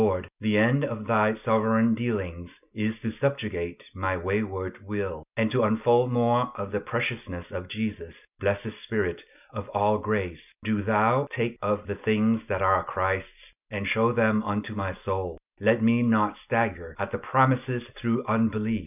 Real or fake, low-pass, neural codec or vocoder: real; 3.6 kHz; none